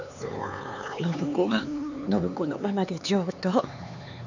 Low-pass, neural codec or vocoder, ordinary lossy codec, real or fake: 7.2 kHz; codec, 16 kHz, 4 kbps, X-Codec, HuBERT features, trained on LibriSpeech; none; fake